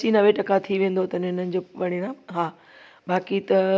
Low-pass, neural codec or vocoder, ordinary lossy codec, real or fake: none; none; none; real